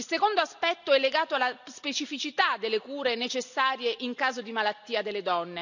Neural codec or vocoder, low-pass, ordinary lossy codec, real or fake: none; 7.2 kHz; none; real